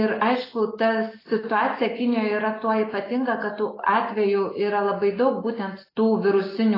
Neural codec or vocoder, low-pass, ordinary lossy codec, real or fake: none; 5.4 kHz; AAC, 24 kbps; real